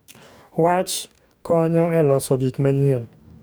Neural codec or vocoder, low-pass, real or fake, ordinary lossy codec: codec, 44.1 kHz, 2.6 kbps, DAC; none; fake; none